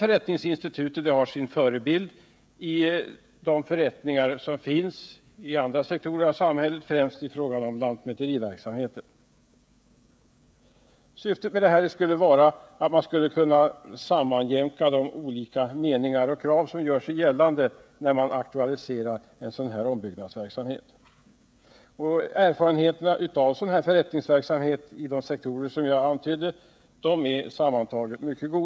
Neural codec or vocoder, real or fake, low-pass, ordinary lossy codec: codec, 16 kHz, 16 kbps, FreqCodec, smaller model; fake; none; none